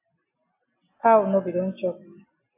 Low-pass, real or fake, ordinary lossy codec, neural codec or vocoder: 3.6 kHz; real; AAC, 24 kbps; none